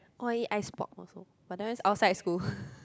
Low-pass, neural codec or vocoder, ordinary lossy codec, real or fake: none; none; none; real